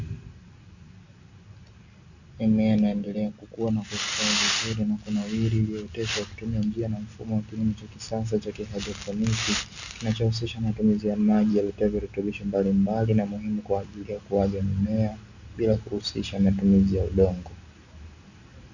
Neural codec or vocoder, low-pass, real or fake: none; 7.2 kHz; real